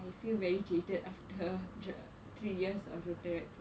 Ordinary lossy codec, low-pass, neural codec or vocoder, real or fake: none; none; none; real